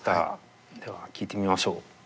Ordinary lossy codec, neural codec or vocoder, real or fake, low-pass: none; none; real; none